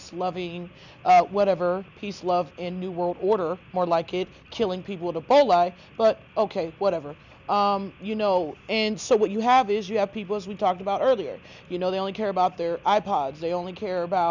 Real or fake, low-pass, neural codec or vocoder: real; 7.2 kHz; none